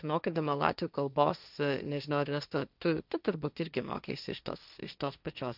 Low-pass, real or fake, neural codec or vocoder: 5.4 kHz; fake; codec, 16 kHz, 1.1 kbps, Voila-Tokenizer